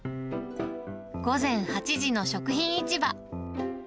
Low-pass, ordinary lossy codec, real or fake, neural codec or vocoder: none; none; real; none